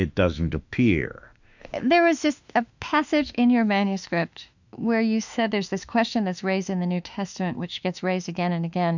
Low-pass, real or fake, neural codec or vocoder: 7.2 kHz; fake; autoencoder, 48 kHz, 32 numbers a frame, DAC-VAE, trained on Japanese speech